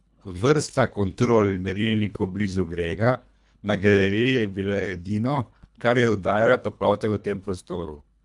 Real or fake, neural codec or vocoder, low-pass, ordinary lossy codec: fake; codec, 24 kHz, 1.5 kbps, HILCodec; 10.8 kHz; none